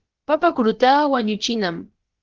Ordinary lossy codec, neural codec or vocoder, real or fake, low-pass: Opus, 16 kbps; codec, 16 kHz, about 1 kbps, DyCAST, with the encoder's durations; fake; 7.2 kHz